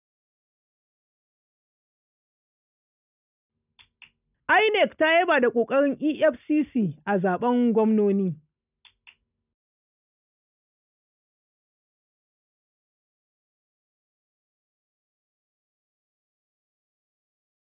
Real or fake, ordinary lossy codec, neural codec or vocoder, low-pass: real; none; none; 3.6 kHz